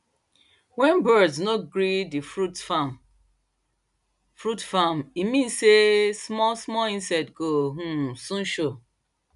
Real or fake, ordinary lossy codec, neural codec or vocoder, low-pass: real; none; none; 10.8 kHz